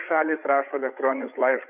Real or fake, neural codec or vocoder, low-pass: fake; codec, 16 kHz, 8 kbps, FreqCodec, larger model; 3.6 kHz